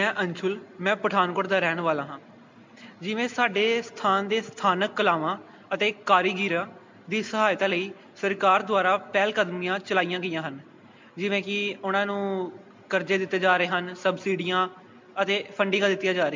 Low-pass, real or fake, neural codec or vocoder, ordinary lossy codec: 7.2 kHz; real; none; MP3, 64 kbps